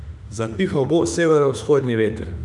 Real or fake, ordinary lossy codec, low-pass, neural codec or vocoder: fake; none; 10.8 kHz; autoencoder, 48 kHz, 32 numbers a frame, DAC-VAE, trained on Japanese speech